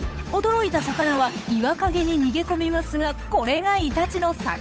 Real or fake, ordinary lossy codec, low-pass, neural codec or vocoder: fake; none; none; codec, 16 kHz, 8 kbps, FunCodec, trained on Chinese and English, 25 frames a second